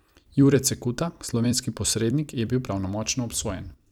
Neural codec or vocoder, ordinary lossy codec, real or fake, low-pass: vocoder, 44.1 kHz, 128 mel bands every 256 samples, BigVGAN v2; none; fake; 19.8 kHz